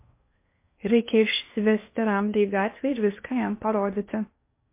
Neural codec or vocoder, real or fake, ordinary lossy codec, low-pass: codec, 16 kHz in and 24 kHz out, 0.8 kbps, FocalCodec, streaming, 65536 codes; fake; MP3, 24 kbps; 3.6 kHz